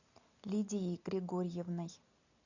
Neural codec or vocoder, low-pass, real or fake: none; 7.2 kHz; real